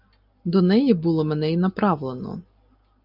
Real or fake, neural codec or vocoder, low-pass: real; none; 5.4 kHz